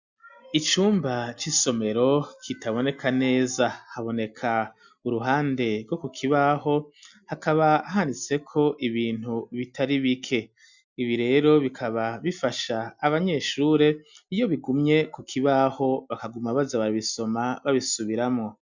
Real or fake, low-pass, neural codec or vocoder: real; 7.2 kHz; none